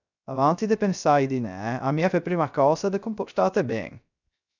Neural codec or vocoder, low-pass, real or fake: codec, 16 kHz, 0.3 kbps, FocalCodec; 7.2 kHz; fake